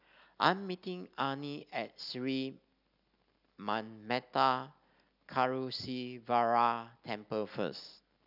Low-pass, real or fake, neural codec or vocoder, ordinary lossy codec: 5.4 kHz; real; none; none